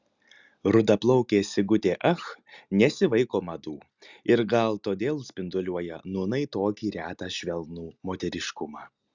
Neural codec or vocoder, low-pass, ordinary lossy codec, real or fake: none; 7.2 kHz; Opus, 64 kbps; real